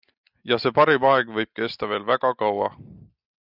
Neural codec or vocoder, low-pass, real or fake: none; 5.4 kHz; real